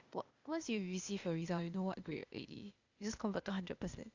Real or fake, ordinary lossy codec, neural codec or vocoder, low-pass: fake; Opus, 64 kbps; codec, 16 kHz, 0.8 kbps, ZipCodec; 7.2 kHz